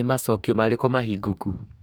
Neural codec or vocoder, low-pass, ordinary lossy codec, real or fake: codec, 44.1 kHz, 2.6 kbps, DAC; none; none; fake